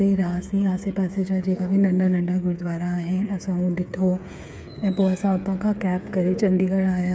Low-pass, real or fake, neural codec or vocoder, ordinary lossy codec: none; fake; codec, 16 kHz, 8 kbps, FreqCodec, smaller model; none